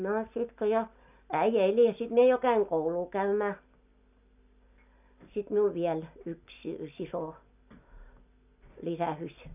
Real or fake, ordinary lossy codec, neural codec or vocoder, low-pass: real; none; none; 3.6 kHz